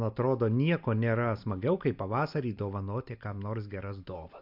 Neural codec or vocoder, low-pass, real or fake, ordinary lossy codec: none; 5.4 kHz; real; MP3, 48 kbps